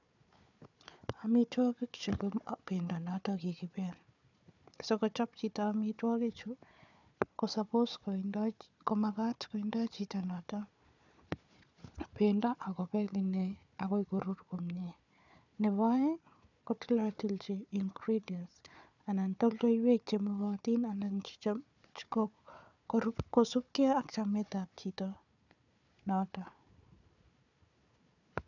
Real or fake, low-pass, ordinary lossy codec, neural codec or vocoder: fake; 7.2 kHz; none; codec, 16 kHz, 4 kbps, FunCodec, trained on Chinese and English, 50 frames a second